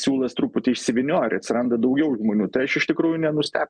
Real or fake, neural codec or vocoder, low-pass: real; none; 9.9 kHz